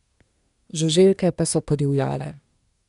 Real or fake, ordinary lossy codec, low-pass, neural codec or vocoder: fake; none; 10.8 kHz; codec, 24 kHz, 1 kbps, SNAC